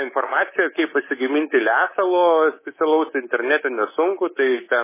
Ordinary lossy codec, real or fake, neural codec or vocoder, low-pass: MP3, 16 kbps; fake; codec, 24 kHz, 3.1 kbps, DualCodec; 3.6 kHz